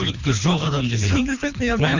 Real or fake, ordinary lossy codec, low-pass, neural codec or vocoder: fake; Opus, 64 kbps; 7.2 kHz; codec, 24 kHz, 3 kbps, HILCodec